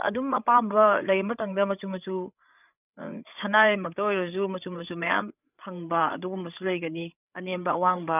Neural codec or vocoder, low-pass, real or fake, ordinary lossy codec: codec, 16 kHz, 8 kbps, FreqCodec, larger model; 3.6 kHz; fake; none